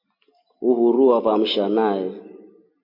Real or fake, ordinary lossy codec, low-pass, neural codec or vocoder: real; MP3, 32 kbps; 5.4 kHz; none